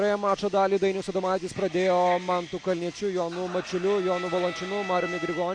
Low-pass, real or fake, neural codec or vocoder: 9.9 kHz; real; none